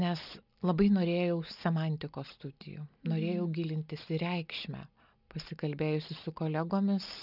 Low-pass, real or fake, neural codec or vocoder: 5.4 kHz; real; none